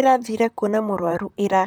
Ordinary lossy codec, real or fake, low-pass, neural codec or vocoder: none; fake; none; vocoder, 44.1 kHz, 128 mel bands, Pupu-Vocoder